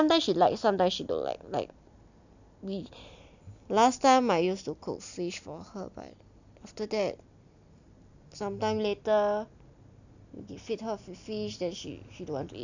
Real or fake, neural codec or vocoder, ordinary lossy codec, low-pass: real; none; none; 7.2 kHz